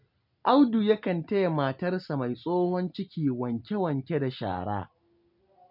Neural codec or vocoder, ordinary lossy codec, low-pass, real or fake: none; none; 5.4 kHz; real